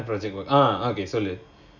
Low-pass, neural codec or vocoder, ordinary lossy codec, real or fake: 7.2 kHz; none; none; real